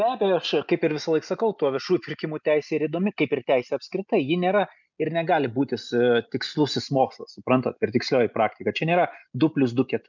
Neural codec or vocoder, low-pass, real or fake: none; 7.2 kHz; real